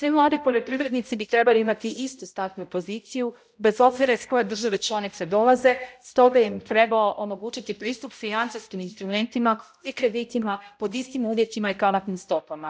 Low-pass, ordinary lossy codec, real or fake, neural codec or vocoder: none; none; fake; codec, 16 kHz, 0.5 kbps, X-Codec, HuBERT features, trained on balanced general audio